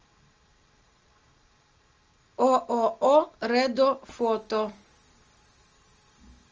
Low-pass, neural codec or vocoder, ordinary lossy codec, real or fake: 7.2 kHz; none; Opus, 24 kbps; real